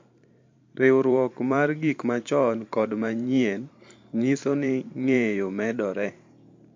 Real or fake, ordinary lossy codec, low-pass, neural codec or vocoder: fake; MP3, 48 kbps; 7.2 kHz; vocoder, 44.1 kHz, 128 mel bands every 512 samples, BigVGAN v2